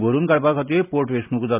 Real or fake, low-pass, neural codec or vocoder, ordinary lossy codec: real; 3.6 kHz; none; none